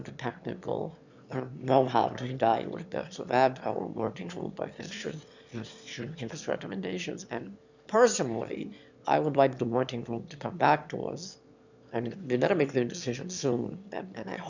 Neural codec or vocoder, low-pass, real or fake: autoencoder, 22.05 kHz, a latent of 192 numbers a frame, VITS, trained on one speaker; 7.2 kHz; fake